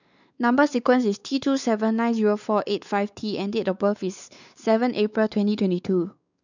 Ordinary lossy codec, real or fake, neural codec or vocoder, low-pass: none; fake; codec, 16 kHz, 4 kbps, X-Codec, WavLM features, trained on Multilingual LibriSpeech; 7.2 kHz